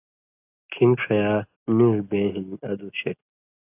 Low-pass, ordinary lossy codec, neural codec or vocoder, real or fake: 3.6 kHz; AAC, 24 kbps; none; real